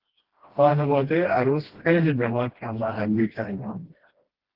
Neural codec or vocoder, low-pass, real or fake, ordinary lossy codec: codec, 16 kHz, 1 kbps, FreqCodec, smaller model; 5.4 kHz; fake; Opus, 16 kbps